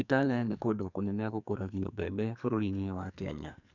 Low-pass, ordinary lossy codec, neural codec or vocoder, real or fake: 7.2 kHz; none; codec, 44.1 kHz, 2.6 kbps, SNAC; fake